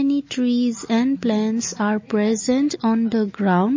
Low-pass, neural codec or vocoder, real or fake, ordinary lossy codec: 7.2 kHz; none; real; MP3, 32 kbps